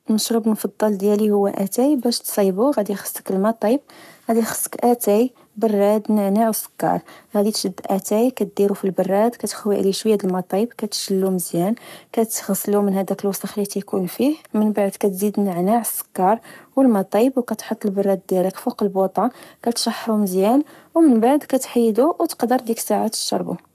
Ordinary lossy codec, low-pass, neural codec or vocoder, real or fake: none; 14.4 kHz; codec, 44.1 kHz, 7.8 kbps, Pupu-Codec; fake